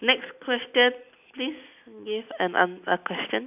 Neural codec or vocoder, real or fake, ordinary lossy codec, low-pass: none; real; none; 3.6 kHz